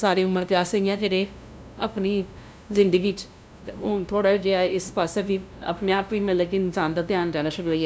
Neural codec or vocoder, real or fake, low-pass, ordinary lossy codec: codec, 16 kHz, 0.5 kbps, FunCodec, trained on LibriTTS, 25 frames a second; fake; none; none